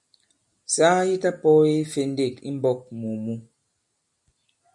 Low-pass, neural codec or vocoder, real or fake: 10.8 kHz; none; real